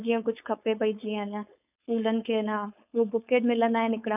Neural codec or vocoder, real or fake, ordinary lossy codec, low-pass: codec, 16 kHz, 4.8 kbps, FACodec; fake; none; 3.6 kHz